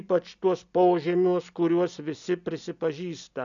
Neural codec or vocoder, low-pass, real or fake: none; 7.2 kHz; real